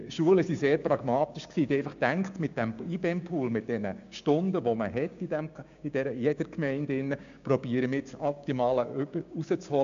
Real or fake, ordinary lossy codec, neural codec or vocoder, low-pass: fake; MP3, 64 kbps; codec, 16 kHz, 6 kbps, DAC; 7.2 kHz